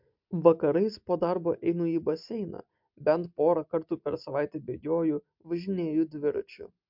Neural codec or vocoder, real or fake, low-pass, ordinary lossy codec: none; real; 5.4 kHz; MP3, 48 kbps